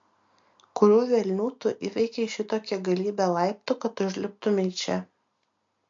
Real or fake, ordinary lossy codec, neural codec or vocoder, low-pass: real; MP3, 48 kbps; none; 7.2 kHz